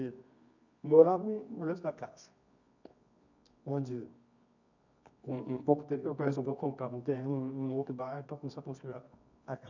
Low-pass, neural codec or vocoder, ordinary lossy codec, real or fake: 7.2 kHz; codec, 24 kHz, 0.9 kbps, WavTokenizer, medium music audio release; none; fake